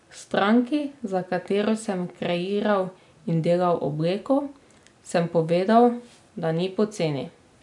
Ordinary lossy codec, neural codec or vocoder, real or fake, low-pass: none; none; real; 10.8 kHz